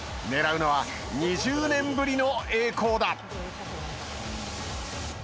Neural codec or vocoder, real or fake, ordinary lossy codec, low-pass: none; real; none; none